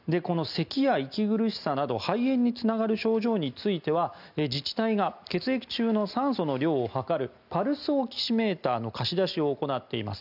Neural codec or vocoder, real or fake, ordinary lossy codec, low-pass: none; real; none; 5.4 kHz